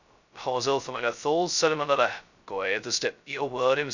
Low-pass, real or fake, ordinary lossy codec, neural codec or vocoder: 7.2 kHz; fake; none; codec, 16 kHz, 0.2 kbps, FocalCodec